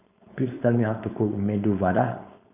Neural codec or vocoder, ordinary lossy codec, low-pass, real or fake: codec, 16 kHz, 4.8 kbps, FACodec; none; 3.6 kHz; fake